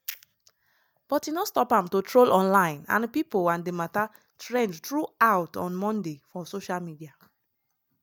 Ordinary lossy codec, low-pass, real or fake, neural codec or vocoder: none; none; real; none